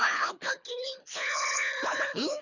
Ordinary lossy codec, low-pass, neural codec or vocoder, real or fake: none; 7.2 kHz; codec, 24 kHz, 3 kbps, HILCodec; fake